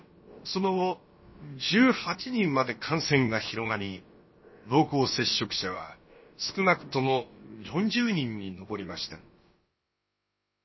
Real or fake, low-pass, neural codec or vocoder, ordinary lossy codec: fake; 7.2 kHz; codec, 16 kHz, about 1 kbps, DyCAST, with the encoder's durations; MP3, 24 kbps